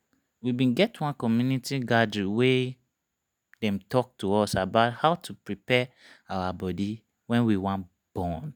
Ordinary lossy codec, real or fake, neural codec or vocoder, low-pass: none; real; none; none